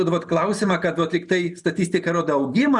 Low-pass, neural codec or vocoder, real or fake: 10.8 kHz; none; real